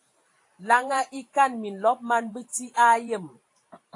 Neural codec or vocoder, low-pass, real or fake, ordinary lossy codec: vocoder, 44.1 kHz, 128 mel bands every 512 samples, BigVGAN v2; 10.8 kHz; fake; AAC, 48 kbps